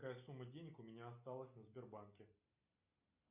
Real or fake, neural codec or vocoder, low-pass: real; none; 3.6 kHz